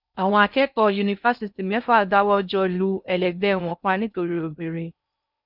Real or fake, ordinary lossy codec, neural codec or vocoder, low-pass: fake; none; codec, 16 kHz in and 24 kHz out, 0.6 kbps, FocalCodec, streaming, 4096 codes; 5.4 kHz